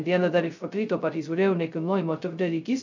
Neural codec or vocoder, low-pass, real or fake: codec, 16 kHz, 0.2 kbps, FocalCodec; 7.2 kHz; fake